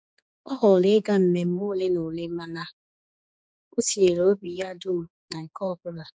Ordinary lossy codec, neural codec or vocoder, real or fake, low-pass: none; codec, 16 kHz, 4 kbps, X-Codec, HuBERT features, trained on general audio; fake; none